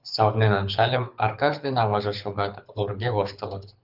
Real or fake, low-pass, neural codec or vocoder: fake; 5.4 kHz; codec, 24 kHz, 6 kbps, HILCodec